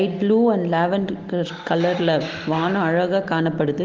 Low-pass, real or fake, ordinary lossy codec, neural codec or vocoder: 7.2 kHz; real; Opus, 16 kbps; none